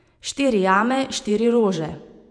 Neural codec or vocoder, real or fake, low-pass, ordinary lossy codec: vocoder, 48 kHz, 128 mel bands, Vocos; fake; 9.9 kHz; none